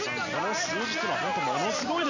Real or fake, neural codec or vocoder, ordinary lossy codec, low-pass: real; none; none; 7.2 kHz